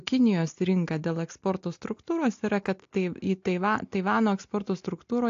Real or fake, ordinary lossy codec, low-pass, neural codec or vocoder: real; AAC, 48 kbps; 7.2 kHz; none